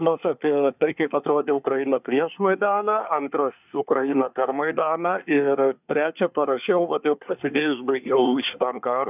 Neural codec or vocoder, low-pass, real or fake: codec, 24 kHz, 1 kbps, SNAC; 3.6 kHz; fake